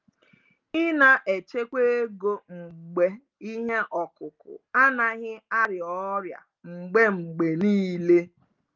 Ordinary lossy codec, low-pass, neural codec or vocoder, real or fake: Opus, 32 kbps; 7.2 kHz; none; real